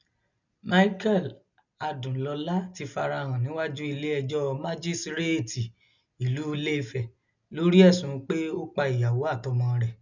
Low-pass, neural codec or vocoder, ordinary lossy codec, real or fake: 7.2 kHz; none; none; real